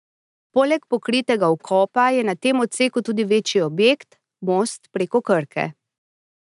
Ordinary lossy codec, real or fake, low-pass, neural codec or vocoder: none; real; 10.8 kHz; none